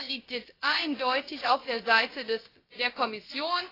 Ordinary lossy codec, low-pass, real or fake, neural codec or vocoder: AAC, 24 kbps; 5.4 kHz; fake; codec, 16 kHz, about 1 kbps, DyCAST, with the encoder's durations